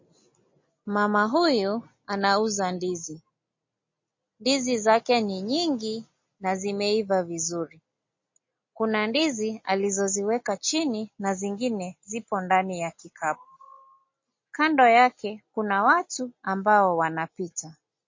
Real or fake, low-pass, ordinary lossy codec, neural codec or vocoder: real; 7.2 kHz; MP3, 32 kbps; none